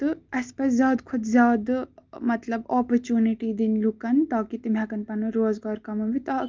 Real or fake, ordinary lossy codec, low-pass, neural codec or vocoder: real; Opus, 32 kbps; 7.2 kHz; none